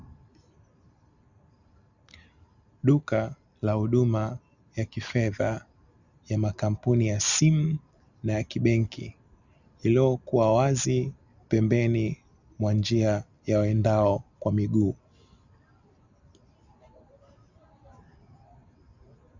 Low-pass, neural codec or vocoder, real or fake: 7.2 kHz; none; real